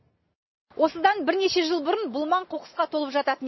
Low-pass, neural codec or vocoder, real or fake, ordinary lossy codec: 7.2 kHz; none; real; MP3, 24 kbps